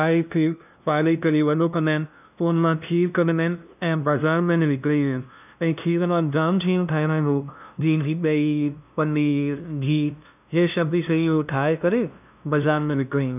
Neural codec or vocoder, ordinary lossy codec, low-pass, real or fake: codec, 16 kHz, 0.5 kbps, FunCodec, trained on LibriTTS, 25 frames a second; none; 3.6 kHz; fake